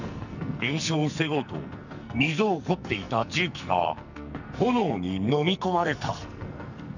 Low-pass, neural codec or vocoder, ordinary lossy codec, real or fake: 7.2 kHz; codec, 44.1 kHz, 2.6 kbps, SNAC; none; fake